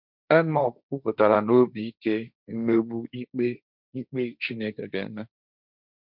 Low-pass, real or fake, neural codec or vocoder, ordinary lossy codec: 5.4 kHz; fake; codec, 16 kHz, 1.1 kbps, Voila-Tokenizer; none